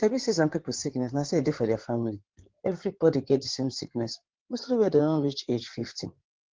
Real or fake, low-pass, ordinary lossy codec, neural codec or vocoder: fake; 7.2 kHz; Opus, 16 kbps; codec, 16 kHz in and 24 kHz out, 2.2 kbps, FireRedTTS-2 codec